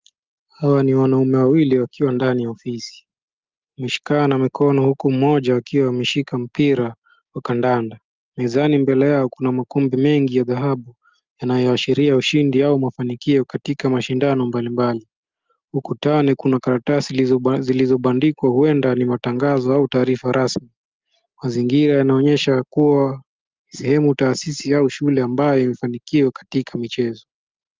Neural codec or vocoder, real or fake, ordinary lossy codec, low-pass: none; real; Opus, 32 kbps; 7.2 kHz